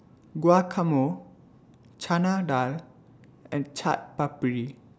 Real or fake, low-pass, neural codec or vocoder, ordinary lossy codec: real; none; none; none